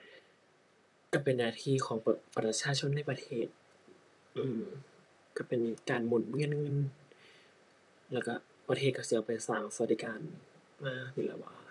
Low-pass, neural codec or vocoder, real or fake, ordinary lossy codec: 10.8 kHz; vocoder, 44.1 kHz, 128 mel bands, Pupu-Vocoder; fake; none